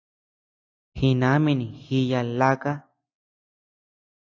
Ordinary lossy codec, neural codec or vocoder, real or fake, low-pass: AAC, 48 kbps; none; real; 7.2 kHz